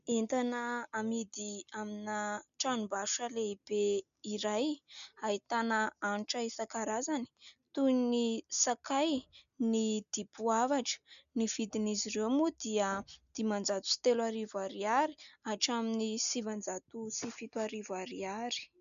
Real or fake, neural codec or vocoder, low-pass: real; none; 7.2 kHz